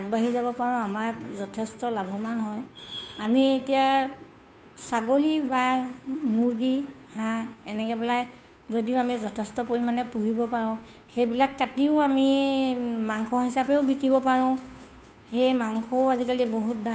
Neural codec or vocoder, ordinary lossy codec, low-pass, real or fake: codec, 16 kHz, 2 kbps, FunCodec, trained on Chinese and English, 25 frames a second; none; none; fake